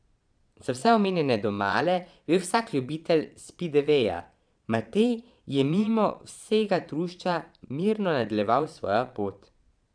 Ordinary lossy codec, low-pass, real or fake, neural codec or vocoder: none; 9.9 kHz; fake; vocoder, 22.05 kHz, 80 mel bands, Vocos